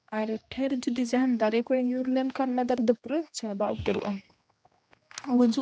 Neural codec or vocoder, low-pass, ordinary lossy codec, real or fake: codec, 16 kHz, 1 kbps, X-Codec, HuBERT features, trained on general audio; none; none; fake